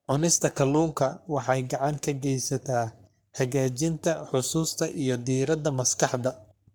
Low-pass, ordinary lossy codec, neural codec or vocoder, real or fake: none; none; codec, 44.1 kHz, 3.4 kbps, Pupu-Codec; fake